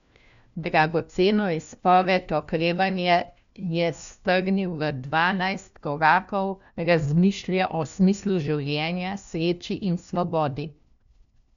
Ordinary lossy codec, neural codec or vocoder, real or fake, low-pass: none; codec, 16 kHz, 1 kbps, FunCodec, trained on LibriTTS, 50 frames a second; fake; 7.2 kHz